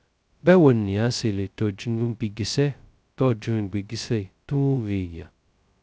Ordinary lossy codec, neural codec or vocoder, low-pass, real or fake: none; codec, 16 kHz, 0.2 kbps, FocalCodec; none; fake